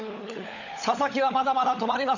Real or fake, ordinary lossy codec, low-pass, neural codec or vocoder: fake; none; 7.2 kHz; codec, 16 kHz, 16 kbps, FunCodec, trained on LibriTTS, 50 frames a second